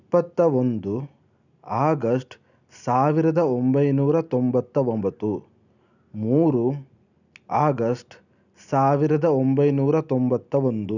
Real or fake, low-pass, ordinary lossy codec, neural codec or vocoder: real; 7.2 kHz; none; none